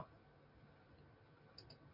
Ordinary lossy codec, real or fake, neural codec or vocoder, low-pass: MP3, 48 kbps; real; none; 5.4 kHz